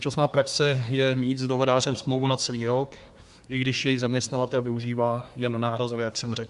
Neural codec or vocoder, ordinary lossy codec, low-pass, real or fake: codec, 24 kHz, 1 kbps, SNAC; Opus, 64 kbps; 10.8 kHz; fake